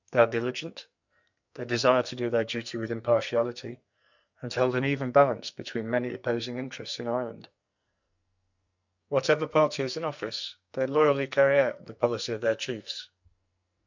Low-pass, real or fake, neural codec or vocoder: 7.2 kHz; fake; codec, 44.1 kHz, 2.6 kbps, SNAC